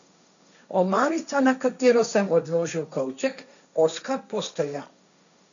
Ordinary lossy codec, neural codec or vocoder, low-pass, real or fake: none; codec, 16 kHz, 1.1 kbps, Voila-Tokenizer; 7.2 kHz; fake